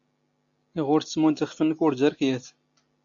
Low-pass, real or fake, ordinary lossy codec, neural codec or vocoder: 7.2 kHz; real; AAC, 64 kbps; none